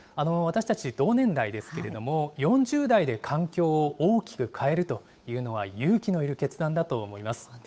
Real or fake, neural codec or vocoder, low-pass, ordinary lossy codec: fake; codec, 16 kHz, 8 kbps, FunCodec, trained on Chinese and English, 25 frames a second; none; none